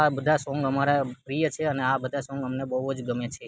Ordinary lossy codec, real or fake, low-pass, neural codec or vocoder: none; real; none; none